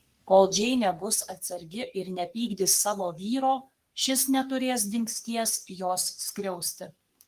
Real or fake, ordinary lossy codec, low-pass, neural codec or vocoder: fake; Opus, 32 kbps; 14.4 kHz; codec, 44.1 kHz, 3.4 kbps, Pupu-Codec